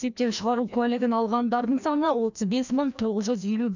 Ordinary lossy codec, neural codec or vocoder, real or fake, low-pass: none; codec, 16 kHz, 1 kbps, FreqCodec, larger model; fake; 7.2 kHz